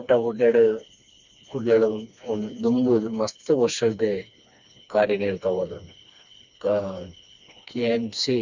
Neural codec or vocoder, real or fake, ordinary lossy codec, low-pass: codec, 16 kHz, 2 kbps, FreqCodec, smaller model; fake; Opus, 64 kbps; 7.2 kHz